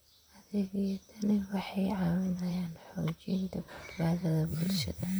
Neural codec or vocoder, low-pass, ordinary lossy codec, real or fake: vocoder, 44.1 kHz, 128 mel bands, Pupu-Vocoder; none; none; fake